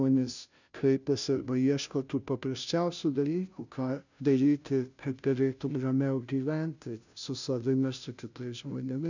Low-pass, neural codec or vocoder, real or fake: 7.2 kHz; codec, 16 kHz, 0.5 kbps, FunCodec, trained on Chinese and English, 25 frames a second; fake